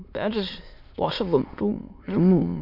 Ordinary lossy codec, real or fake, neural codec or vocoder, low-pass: MP3, 48 kbps; fake; autoencoder, 22.05 kHz, a latent of 192 numbers a frame, VITS, trained on many speakers; 5.4 kHz